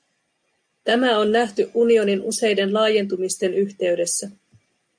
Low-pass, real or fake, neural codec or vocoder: 9.9 kHz; real; none